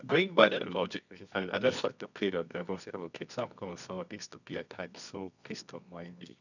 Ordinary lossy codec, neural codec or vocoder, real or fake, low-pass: none; codec, 24 kHz, 0.9 kbps, WavTokenizer, medium music audio release; fake; 7.2 kHz